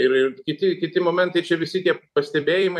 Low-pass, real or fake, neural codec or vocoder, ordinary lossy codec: 14.4 kHz; fake; vocoder, 44.1 kHz, 128 mel bands every 256 samples, BigVGAN v2; AAC, 96 kbps